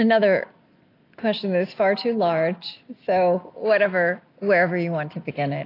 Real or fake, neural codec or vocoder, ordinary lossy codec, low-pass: real; none; AAC, 32 kbps; 5.4 kHz